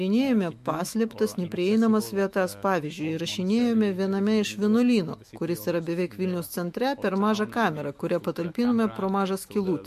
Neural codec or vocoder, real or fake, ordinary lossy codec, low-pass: autoencoder, 48 kHz, 128 numbers a frame, DAC-VAE, trained on Japanese speech; fake; MP3, 64 kbps; 14.4 kHz